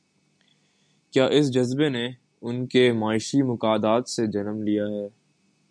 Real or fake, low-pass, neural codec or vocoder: real; 9.9 kHz; none